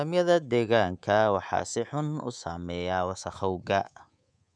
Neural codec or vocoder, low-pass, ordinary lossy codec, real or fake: codec, 24 kHz, 3.1 kbps, DualCodec; 9.9 kHz; none; fake